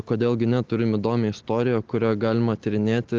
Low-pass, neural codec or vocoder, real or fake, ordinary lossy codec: 7.2 kHz; none; real; Opus, 16 kbps